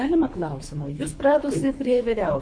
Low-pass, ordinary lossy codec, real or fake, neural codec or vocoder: 9.9 kHz; MP3, 48 kbps; fake; codec, 24 kHz, 3 kbps, HILCodec